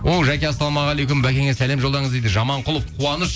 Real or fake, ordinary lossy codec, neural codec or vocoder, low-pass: real; none; none; none